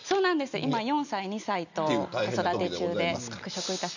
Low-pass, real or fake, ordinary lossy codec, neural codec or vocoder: 7.2 kHz; real; none; none